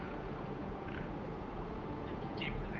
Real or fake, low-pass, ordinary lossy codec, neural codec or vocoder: fake; 7.2 kHz; Opus, 32 kbps; codec, 16 kHz, 8 kbps, FunCodec, trained on Chinese and English, 25 frames a second